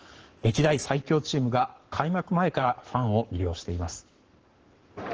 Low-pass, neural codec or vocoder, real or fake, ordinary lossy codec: 7.2 kHz; codec, 44.1 kHz, 7.8 kbps, Pupu-Codec; fake; Opus, 16 kbps